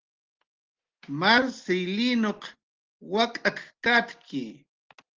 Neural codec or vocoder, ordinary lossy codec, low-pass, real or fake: none; Opus, 16 kbps; 7.2 kHz; real